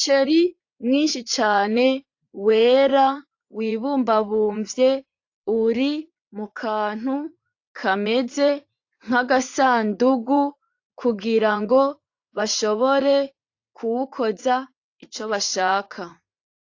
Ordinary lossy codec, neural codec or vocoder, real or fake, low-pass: AAC, 48 kbps; vocoder, 44.1 kHz, 80 mel bands, Vocos; fake; 7.2 kHz